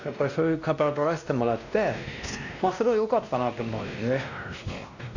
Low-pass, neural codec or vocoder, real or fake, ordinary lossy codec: 7.2 kHz; codec, 16 kHz, 1 kbps, X-Codec, WavLM features, trained on Multilingual LibriSpeech; fake; none